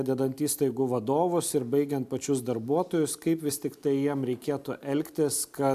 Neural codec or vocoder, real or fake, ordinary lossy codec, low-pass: none; real; AAC, 96 kbps; 14.4 kHz